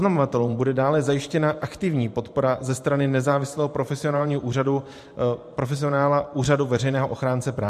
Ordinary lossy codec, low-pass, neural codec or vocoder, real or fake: MP3, 64 kbps; 14.4 kHz; vocoder, 44.1 kHz, 128 mel bands every 512 samples, BigVGAN v2; fake